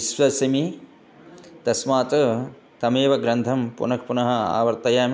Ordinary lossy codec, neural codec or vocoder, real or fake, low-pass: none; none; real; none